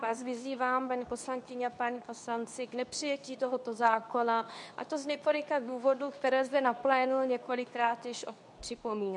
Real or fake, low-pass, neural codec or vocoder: fake; 10.8 kHz; codec, 24 kHz, 0.9 kbps, WavTokenizer, medium speech release version 2